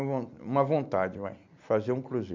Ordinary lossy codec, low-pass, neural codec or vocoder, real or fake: MP3, 64 kbps; 7.2 kHz; none; real